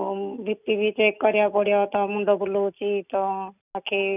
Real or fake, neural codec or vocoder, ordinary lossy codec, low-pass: real; none; none; 3.6 kHz